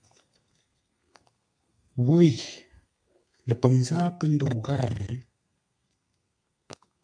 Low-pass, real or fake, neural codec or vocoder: 9.9 kHz; fake; codec, 32 kHz, 1.9 kbps, SNAC